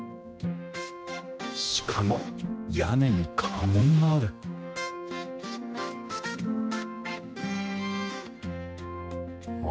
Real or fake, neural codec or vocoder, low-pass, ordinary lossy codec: fake; codec, 16 kHz, 1 kbps, X-Codec, HuBERT features, trained on general audio; none; none